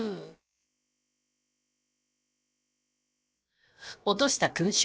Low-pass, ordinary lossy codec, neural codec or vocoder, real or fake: none; none; codec, 16 kHz, about 1 kbps, DyCAST, with the encoder's durations; fake